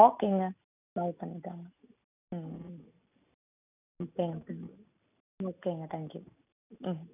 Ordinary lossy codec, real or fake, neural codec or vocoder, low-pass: none; real; none; 3.6 kHz